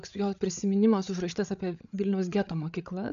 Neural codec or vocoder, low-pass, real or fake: codec, 16 kHz, 8 kbps, FreqCodec, larger model; 7.2 kHz; fake